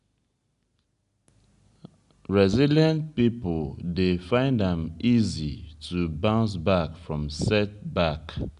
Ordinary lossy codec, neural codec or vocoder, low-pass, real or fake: none; none; 10.8 kHz; real